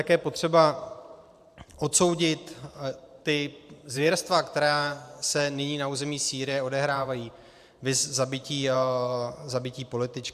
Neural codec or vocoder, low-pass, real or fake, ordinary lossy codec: vocoder, 44.1 kHz, 128 mel bands every 512 samples, BigVGAN v2; 14.4 kHz; fake; AAC, 96 kbps